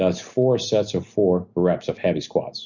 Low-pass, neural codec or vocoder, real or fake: 7.2 kHz; none; real